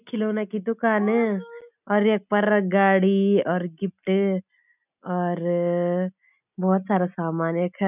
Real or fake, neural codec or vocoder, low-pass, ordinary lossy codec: real; none; 3.6 kHz; none